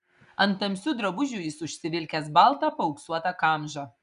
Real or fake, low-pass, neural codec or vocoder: real; 10.8 kHz; none